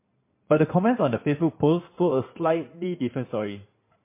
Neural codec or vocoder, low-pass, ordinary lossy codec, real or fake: codec, 16 kHz in and 24 kHz out, 2.2 kbps, FireRedTTS-2 codec; 3.6 kHz; MP3, 24 kbps; fake